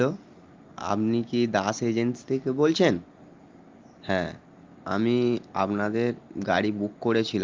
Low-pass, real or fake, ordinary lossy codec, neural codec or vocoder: 7.2 kHz; real; Opus, 32 kbps; none